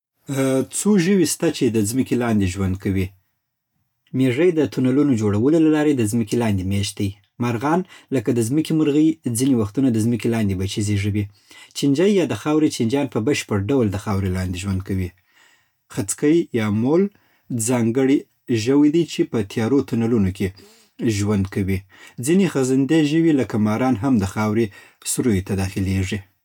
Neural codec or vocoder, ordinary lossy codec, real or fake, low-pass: none; none; real; 19.8 kHz